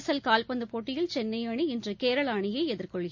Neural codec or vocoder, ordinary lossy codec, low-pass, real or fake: none; MP3, 48 kbps; 7.2 kHz; real